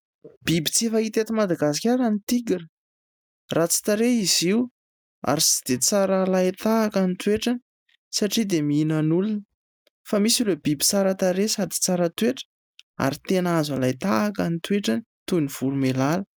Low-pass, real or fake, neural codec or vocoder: 19.8 kHz; real; none